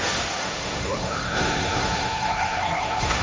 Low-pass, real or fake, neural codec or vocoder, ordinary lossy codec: none; fake; codec, 16 kHz, 1.1 kbps, Voila-Tokenizer; none